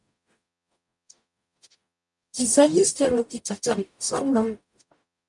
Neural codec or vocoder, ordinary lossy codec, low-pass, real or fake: codec, 44.1 kHz, 0.9 kbps, DAC; MP3, 96 kbps; 10.8 kHz; fake